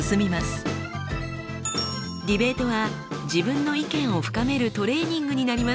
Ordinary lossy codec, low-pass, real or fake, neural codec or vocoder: none; none; real; none